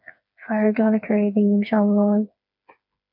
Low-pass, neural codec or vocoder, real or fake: 5.4 kHz; codec, 16 kHz, 4 kbps, FreqCodec, smaller model; fake